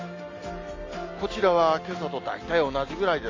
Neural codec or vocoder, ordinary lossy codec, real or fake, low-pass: none; AAC, 32 kbps; real; 7.2 kHz